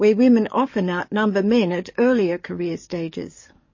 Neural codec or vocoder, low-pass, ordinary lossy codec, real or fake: codec, 44.1 kHz, 7.8 kbps, DAC; 7.2 kHz; MP3, 32 kbps; fake